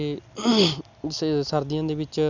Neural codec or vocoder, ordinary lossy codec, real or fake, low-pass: none; none; real; 7.2 kHz